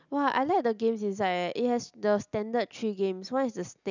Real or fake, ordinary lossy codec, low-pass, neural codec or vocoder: real; none; 7.2 kHz; none